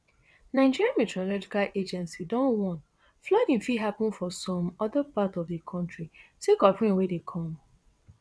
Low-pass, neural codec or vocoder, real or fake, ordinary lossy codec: none; vocoder, 22.05 kHz, 80 mel bands, WaveNeXt; fake; none